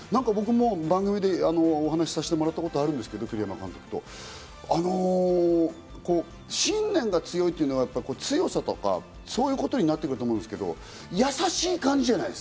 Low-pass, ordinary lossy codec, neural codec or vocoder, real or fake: none; none; none; real